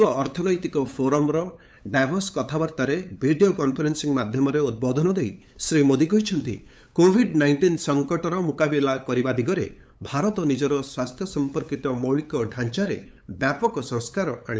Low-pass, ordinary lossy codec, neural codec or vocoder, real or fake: none; none; codec, 16 kHz, 8 kbps, FunCodec, trained on LibriTTS, 25 frames a second; fake